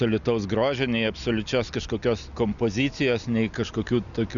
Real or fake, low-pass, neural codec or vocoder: real; 7.2 kHz; none